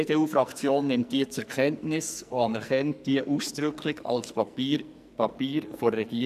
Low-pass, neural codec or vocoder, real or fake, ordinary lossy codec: 14.4 kHz; codec, 44.1 kHz, 2.6 kbps, SNAC; fake; none